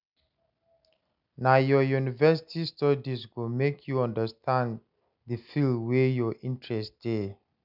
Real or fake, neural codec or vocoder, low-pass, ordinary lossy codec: real; none; 5.4 kHz; none